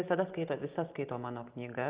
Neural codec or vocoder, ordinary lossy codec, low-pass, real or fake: none; Opus, 24 kbps; 3.6 kHz; real